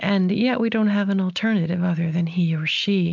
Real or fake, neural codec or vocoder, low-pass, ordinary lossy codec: real; none; 7.2 kHz; MP3, 64 kbps